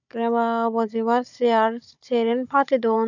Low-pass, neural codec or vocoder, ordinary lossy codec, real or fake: 7.2 kHz; codec, 16 kHz, 16 kbps, FunCodec, trained on Chinese and English, 50 frames a second; none; fake